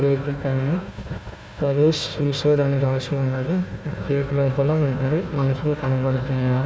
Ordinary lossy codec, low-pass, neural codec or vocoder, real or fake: none; none; codec, 16 kHz, 1 kbps, FunCodec, trained on Chinese and English, 50 frames a second; fake